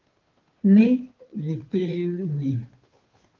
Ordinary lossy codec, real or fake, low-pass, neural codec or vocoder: Opus, 24 kbps; fake; 7.2 kHz; codec, 16 kHz, 2 kbps, FunCodec, trained on Chinese and English, 25 frames a second